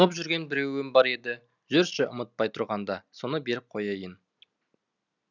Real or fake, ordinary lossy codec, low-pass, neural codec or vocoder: real; none; 7.2 kHz; none